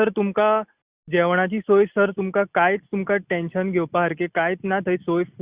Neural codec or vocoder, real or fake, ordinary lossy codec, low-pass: none; real; Opus, 64 kbps; 3.6 kHz